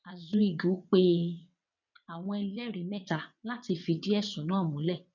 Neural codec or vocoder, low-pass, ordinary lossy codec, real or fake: vocoder, 22.05 kHz, 80 mel bands, WaveNeXt; 7.2 kHz; none; fake